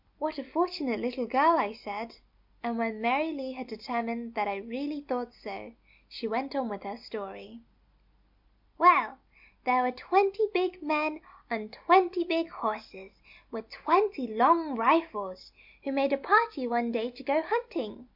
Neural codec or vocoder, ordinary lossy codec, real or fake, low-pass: none; MP3, 48 kbps; real; 5.4 kHz